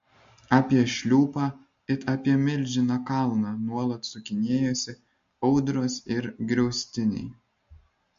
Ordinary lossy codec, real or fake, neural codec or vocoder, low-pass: MP3, 48 kbps; real; none; 7.2 kHz